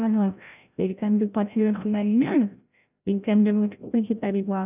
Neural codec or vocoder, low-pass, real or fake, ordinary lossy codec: codec, 16 kHz, 0.5 kbps, FreqCodec, larger model; 3.6 kHz; fake; none